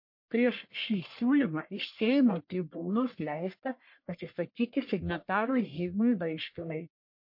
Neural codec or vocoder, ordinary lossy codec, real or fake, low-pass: codec, 44.1 kHz, 1.7 kbps, Pupu-Codec; MP3, 32 kbps; fake; 5.4 kHz